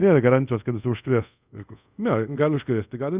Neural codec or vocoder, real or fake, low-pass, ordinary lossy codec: codec, 24 kHz, 0.5 kbps, DualCodec; fake; 3.6 kHz; Opus, 64 kbps